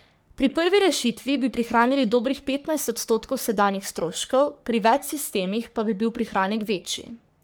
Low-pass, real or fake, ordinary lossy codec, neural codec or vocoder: none; fake; none; codec, 44.1 kHz, 3.4 kbps, Pupu-Codec